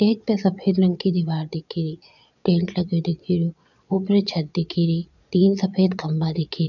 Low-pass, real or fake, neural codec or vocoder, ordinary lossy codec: 7.2 kHz; fake; vocoder, 22.05 kHz, 80 mel bands, Vocos; none